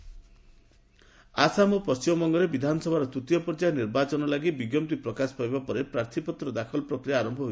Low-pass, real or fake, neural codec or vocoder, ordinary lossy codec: none; real; none; none